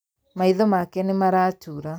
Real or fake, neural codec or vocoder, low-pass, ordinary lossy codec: real; none; none; none